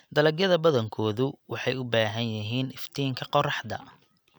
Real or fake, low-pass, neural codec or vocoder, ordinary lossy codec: real; none; none; none